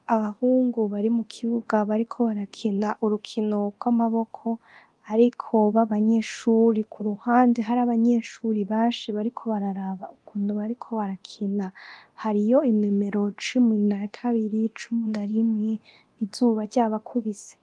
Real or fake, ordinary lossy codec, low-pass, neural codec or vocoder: fake; Opus, 24 kbps; 10.8 kHz; codec, 24 kHz, 0.9 kbps, DualCodec